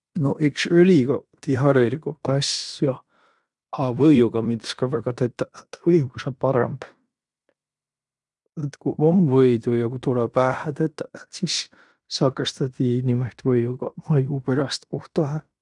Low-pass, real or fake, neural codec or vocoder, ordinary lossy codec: 10.8 kHz; fake; codec, 16 kHz in and 24 kHz out, 0.9 kbps, LongCat-Audio-Codec, fine tuned four codebook decoder; none